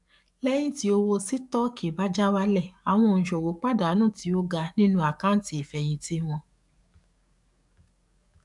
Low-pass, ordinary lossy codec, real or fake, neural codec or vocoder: 10.8 kHz; none; fake; codec, 44.1 kHz, 7.8 kbps, DAC